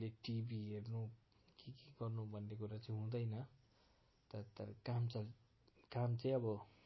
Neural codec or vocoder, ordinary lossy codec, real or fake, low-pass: none; MP3, 24 kbps; real; 7.2 kHz